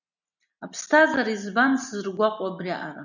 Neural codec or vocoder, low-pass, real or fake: none; 7.2 kHz; real